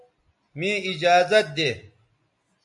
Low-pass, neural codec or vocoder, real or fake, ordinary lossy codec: 10.8 kHz; none; real; MP3, 64 kbps